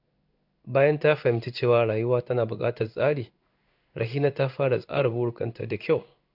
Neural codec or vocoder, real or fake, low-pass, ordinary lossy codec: codec, 16 kHz in and 24 kHz out, 1 kbps, XY-Tokenizer; fake; 5.4 kHz; none